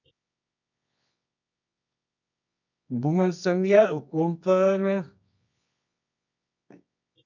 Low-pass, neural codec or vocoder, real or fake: 7.2 kHz; codec, 24 kHz, 0.9 kbps, WavTokenizer, medium music audio release; fake